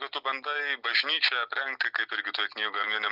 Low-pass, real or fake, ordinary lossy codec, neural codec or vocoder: 5.4 kHz; real; Opus, 64 kbps; none